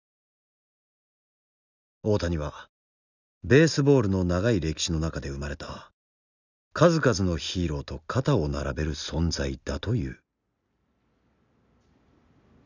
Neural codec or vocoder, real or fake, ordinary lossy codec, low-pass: none; real; none; 7.2 kHz